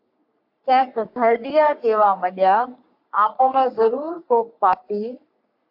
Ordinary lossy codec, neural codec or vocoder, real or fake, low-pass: AAC, 48 kbps; codec, 44.1 kHz, 3.4 kbps, Pupu-Codec; fake; 5.4 kHz